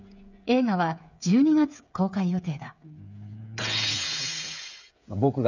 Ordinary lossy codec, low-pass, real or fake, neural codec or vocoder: none; 7.2 kHz; fake; codec, 16 kHz, 8 kbps, FreqCodec, smaller model